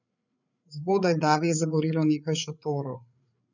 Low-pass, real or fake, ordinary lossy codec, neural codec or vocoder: 7.2 kHz; fake; none; codec, 16 kHz, 8 kbps, FreqCodec, larger model